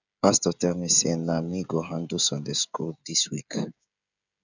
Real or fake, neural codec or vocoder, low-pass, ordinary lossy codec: fake; codec, 16 kHz, 16 kbps, FreqCodec, smaller model; 7.2 kHz; none